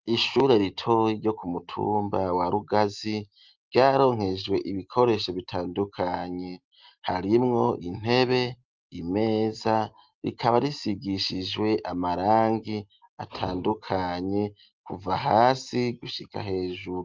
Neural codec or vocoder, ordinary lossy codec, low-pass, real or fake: none; Opus, 32 kbps; 7.2 kHz; real